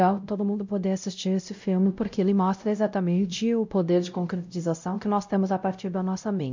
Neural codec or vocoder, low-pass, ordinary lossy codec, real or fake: codec, 16 kHz, 0.5 kbps, X-Codec, WavLM features, trained on Multilingual LibriSpeech; 7.2 kHz; MP3, 64 kbps; fake